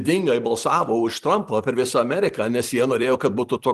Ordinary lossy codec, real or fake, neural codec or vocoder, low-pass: Opus, 24 kbps; fake; vocoder, 44.1 kHz, 128 mel bands every 256 samples, BigVGAN v2; 14.4 kHz